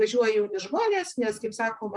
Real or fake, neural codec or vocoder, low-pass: fake; vocoder, 44.1 kHz, 128 mel bands, Pupu-Vocoder; 10.8 kHz